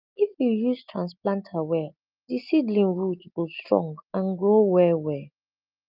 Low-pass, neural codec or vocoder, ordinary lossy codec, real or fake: 5.4 kHz; none; Opus, 32 kbps; real